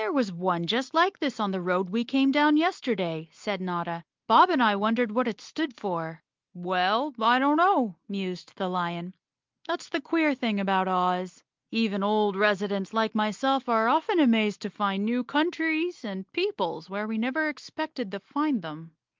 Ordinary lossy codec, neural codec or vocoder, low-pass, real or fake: Opus, 32 kbps; none; 7.2 kHz; real